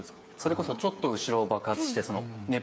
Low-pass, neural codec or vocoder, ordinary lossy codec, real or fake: none; codec, 16 kHz, 8 kbps, FreqCodec, smaller model; none; fake